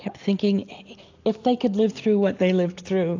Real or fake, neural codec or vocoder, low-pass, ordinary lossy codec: real; none; 7.2 kHz; AAC, 48 kbps